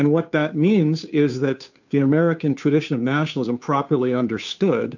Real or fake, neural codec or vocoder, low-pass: fake; codec, 16 kHz, 2 kbps, FunCodec, trained on Chinese and English, 25 frames a second; 7.2 kHz